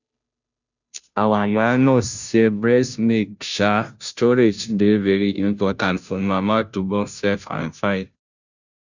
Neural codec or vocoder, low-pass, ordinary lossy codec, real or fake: codec, 16 kHz, 0.5 kbps, FunCodec, trained on Chinese and English, 25 frames a second; 7.2 kHz; none; fake